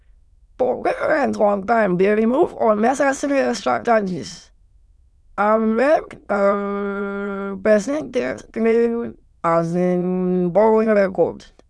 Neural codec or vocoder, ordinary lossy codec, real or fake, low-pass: autoencoder, 22.05 kHz, a latent of 192 numbers a frame, VITS, trained on many speakers; none; fake; none